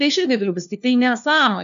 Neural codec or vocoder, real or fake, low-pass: codec, 16 kHz, 1 kbps, FunCodec, trained on LibriTTS, 50 frames a second; fake; 7.2 kHz